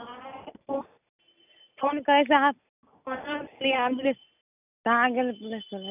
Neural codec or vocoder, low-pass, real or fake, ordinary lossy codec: none; 3.6 kHz; real; none